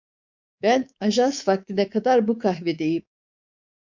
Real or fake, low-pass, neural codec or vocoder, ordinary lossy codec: fake; 7.2 kHz; codec, 24 kHz, 3.1 kbps, DualCodec; MP3, 64 kbps